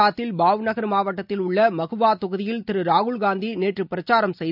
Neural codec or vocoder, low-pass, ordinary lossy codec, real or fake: none; 5.4 kHz; none; real